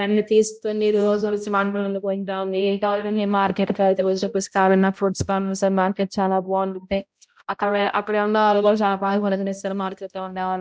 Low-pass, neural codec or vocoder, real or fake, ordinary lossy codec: none; codec, 16 kHz, 0.5 kbps, X-Codec, HuBERT features, trained on balanced general audio; fake; none